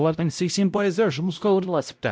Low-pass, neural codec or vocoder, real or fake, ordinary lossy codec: none; codec, 16 kHz, 0.5 kbps, X-Codec, WavLM features, trained on Multilingual LibriSpeech; fake; none